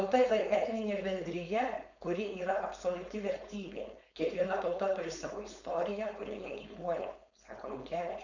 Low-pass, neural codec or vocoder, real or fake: 7.2 kHz; codec, 16 kHz, 4.8 kbps, FACodec; fake